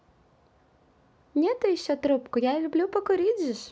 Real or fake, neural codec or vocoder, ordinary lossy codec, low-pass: real; none; none; none